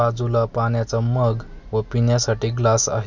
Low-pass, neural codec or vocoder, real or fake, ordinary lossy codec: 7.2 kHz; none; real; none